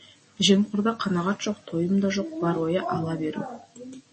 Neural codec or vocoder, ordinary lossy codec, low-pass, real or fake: none; MP3, 32 kbps; 10.8 kHz; real